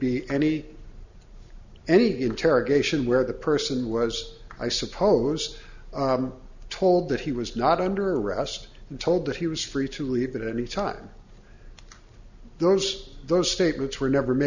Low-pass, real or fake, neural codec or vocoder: 7.2 kHz; real; none